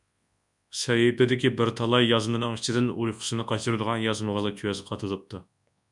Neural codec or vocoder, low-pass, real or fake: codec, 24 kHz, 0.9 kbps, WavTokenizer, large speech release; 10.8 kHz; fake